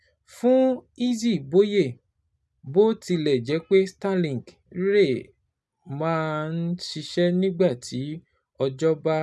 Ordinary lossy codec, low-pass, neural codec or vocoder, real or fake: none; none; none; real